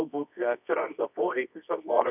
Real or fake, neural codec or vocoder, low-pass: fake; codec, 24 kHz, 0.9 kbps, WavTokenizer, medium music audio release; 3.6 kHz